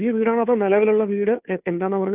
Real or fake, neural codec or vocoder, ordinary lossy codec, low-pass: fake; codec, 16 kHz, 2 kbps, FunCodec, trained on Chinese and English, 25 frames a second; none; 3.6 kHz